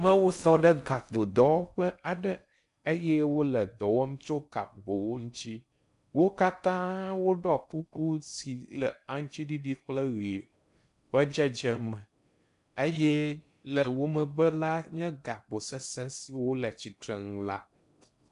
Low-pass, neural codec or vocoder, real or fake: 10.8 kHz; codec, 16 kHz in and 24 kHz out, 0.6 kbps, FocalCodec, streaming, 4096 codes; fake